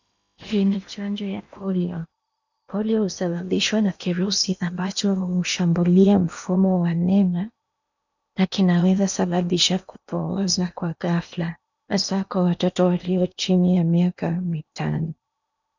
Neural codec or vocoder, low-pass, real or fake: codec, 16 kHz in and 24 kHz out, 0.8 kbps, FocalCodec, streaming, 65536 codes; 7.2 kHz; fake